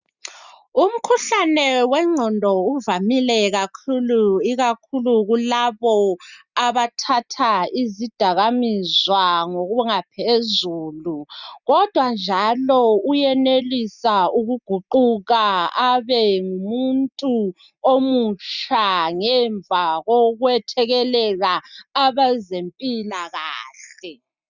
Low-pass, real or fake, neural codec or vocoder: 7.2 kHz; real; none